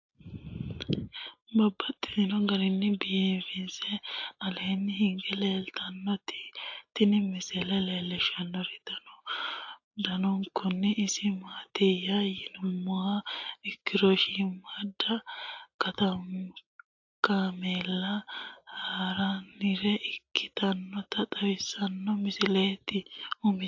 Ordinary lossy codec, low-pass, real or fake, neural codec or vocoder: AAC, 48 kbps; 7.2 kHz; real; none